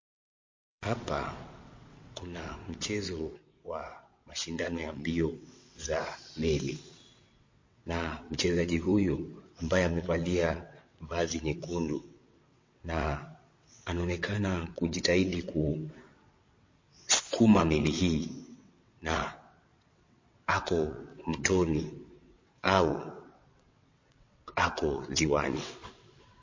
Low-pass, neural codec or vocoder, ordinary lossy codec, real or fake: 7.2 kHz; vocoder, 22.05 kHz, 80 mel bands, WaveNeXt; MP3, 32 kbps; fake